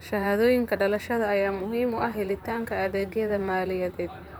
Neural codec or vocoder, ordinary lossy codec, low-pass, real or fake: vocoder, 44.1 kHz, 128 mel bands, Pupu-Vocoder; none; none; fake